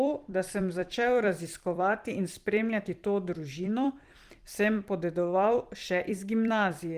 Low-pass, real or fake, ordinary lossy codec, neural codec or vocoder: 14.4 kHz; fake; Opus, 24 kbps; vocoder, 44.1 kHz, 128 mel bands every 256 samples, BigVGAN v2